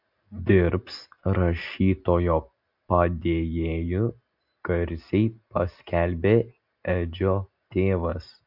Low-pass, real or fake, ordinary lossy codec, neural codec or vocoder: 5.4 kHz; real; MP3, 48 kbps; none